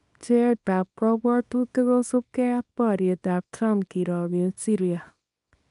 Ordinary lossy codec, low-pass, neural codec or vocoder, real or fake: none; 10.8 kHz; codec, 24 kHz, 0.9 kbps, WavTokenizer, small release; fake